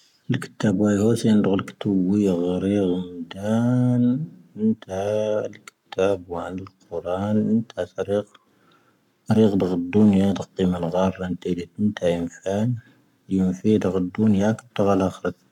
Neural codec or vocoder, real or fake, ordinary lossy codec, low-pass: codec, 44.1 kHz, 7.8 kbps, Pupu-Codec; fake; none; 19.8 kHz